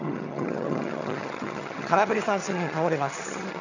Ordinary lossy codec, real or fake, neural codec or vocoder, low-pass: none; fake; vocoder, 22.05 kHz, 80 mel bands, HiFi-GAN; 7.2 kHz